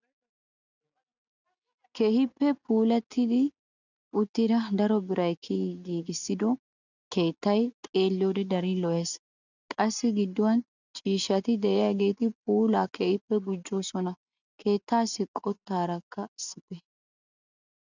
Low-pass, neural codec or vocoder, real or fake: 7.2 kHz; none; real